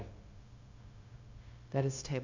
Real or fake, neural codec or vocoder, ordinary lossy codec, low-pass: fake; codec, 16 kHz, 0.9 kbps, LongCat-Audio-Codec; AAC, 48 kbps; 7.2 kHz